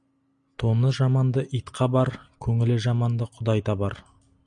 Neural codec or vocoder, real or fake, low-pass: none; real; 9.9 kHz